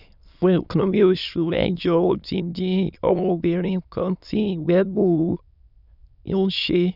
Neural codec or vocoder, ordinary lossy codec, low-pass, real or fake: autoencoder, 22.05 kHz, a latent of 192 numbers a frame, VITS, trained on many speakers; none; 5.4 kHz; fake